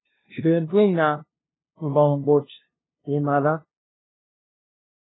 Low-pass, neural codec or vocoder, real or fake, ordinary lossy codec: 7.2 kHz; codec, 16 kHz, 0.5 kbps, FunCodec, trained on LibriTTS, 25 frames a second; fake; AAC, 16 kbps